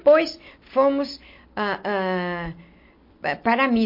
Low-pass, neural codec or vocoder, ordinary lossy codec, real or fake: 5.4 kHz; none; MP3, 32 kbps; real